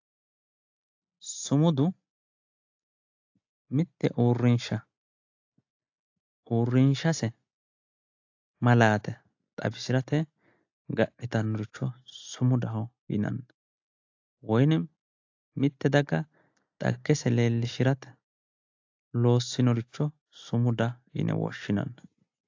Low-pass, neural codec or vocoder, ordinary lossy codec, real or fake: 7.2 kHz; none; AAC, 48 kbps; real